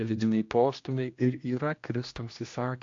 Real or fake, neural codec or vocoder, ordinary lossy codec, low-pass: fake; codec, 16 kHz, 1 kbps, X-Codec, HuBERT features, trained on general audio; AAC, 48 kbps; 7.2 kHz